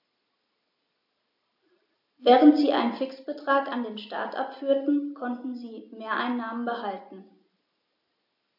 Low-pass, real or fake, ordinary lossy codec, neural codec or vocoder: 5.4 kHz; real; AAC, 48 kbps; none